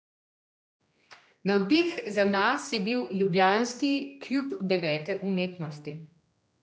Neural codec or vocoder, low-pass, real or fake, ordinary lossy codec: codec, 16 kHz, 1 kbps, X-Codec, HuBERT features, trained on general audio; none; fake; none